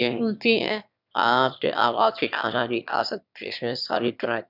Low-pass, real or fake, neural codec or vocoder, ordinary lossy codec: 5.4 kHz; fake; autoencoder, 22.05 kHz, a latent of 192 numbers a frame, VITS, trained on one speaker; none